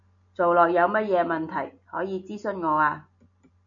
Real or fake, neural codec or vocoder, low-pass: real; none; 7.2 kHz